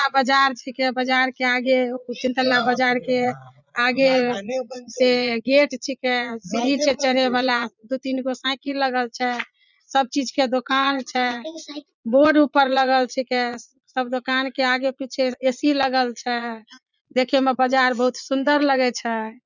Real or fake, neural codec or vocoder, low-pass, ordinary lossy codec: fake; vocoder, 22.05 kHz, 80 mel bands, Vocos; 7.2 kHz; none